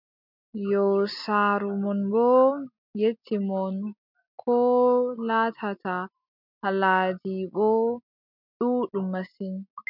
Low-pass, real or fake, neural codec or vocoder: 5.4 kHz; real; none